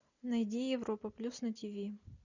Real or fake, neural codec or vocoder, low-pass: real; none; 7.2 kHz